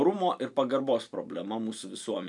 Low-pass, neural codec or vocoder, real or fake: 10.8 kHz; none; real